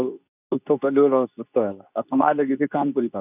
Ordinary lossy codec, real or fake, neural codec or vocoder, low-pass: none; fake; codec, 24 kHz, 0.9 kbps, WavTokenizer, medium speech release version 2; 3.6 kHz